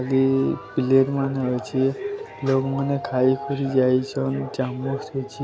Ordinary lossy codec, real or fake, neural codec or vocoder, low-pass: none; real; none; none